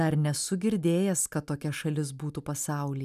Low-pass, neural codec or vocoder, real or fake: 14.4 kHz; none; real